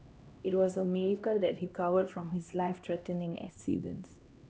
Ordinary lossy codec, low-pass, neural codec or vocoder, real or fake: none; none; codec, 16 kHz, 1 kbps, X-Codec, HuBERT features, trained on LibriSpeech; fake